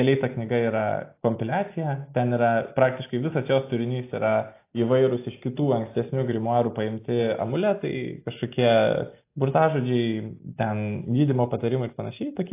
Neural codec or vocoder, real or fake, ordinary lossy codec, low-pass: none; real; AAC, 24 kbps; 3.6 kHz